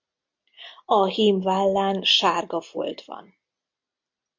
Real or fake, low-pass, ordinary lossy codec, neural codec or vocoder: real; 7.2 kHz; MP3, 48 kbps; none